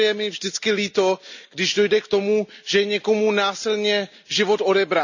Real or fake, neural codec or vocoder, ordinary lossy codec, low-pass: real; none; none; 7.2 kHz